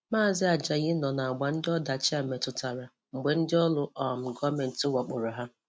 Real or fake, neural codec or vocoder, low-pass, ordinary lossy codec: real; none; none; none